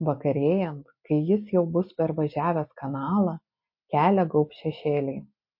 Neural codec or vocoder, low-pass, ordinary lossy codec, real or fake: none; 5.4 kHz; MP3, 32 kbps; real